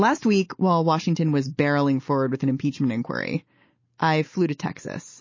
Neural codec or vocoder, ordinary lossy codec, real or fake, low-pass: none; MP3, 32 kbps; real; 7.2 kHz